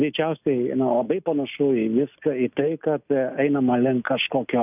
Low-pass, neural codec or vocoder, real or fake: 3.6 kHz; none; real